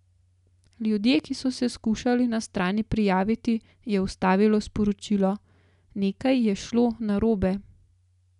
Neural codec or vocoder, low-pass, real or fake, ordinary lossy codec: none; 10.8 kHz; real; none